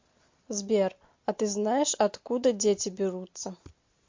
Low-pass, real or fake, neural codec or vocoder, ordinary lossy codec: 7.2 kHz; real; none; MP3, 48 kbps